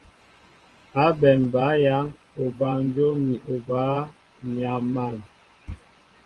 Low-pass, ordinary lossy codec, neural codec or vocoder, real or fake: 10.8 kHz; Opus, 24 kbps; vocoder, 24 kHz, 100 mel bands, Vocos; fake